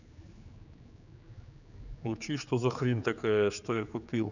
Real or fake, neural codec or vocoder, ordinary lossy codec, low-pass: fake; codec, 16 kHz, 4 kbps, X-Codec, HuBERT features, trained on general audio; none; 7.2 kHz